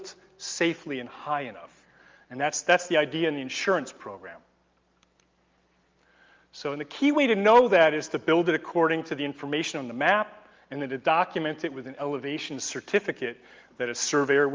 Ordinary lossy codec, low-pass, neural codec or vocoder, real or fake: Opus, 32 kbps; 7.2 kHz; none; real